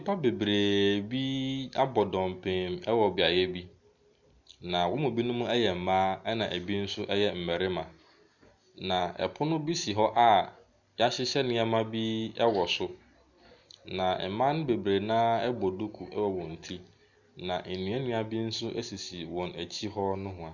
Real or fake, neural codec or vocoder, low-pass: real; none; 7.2 kHz